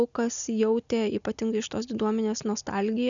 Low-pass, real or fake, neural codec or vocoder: 7.2 kHz; real; none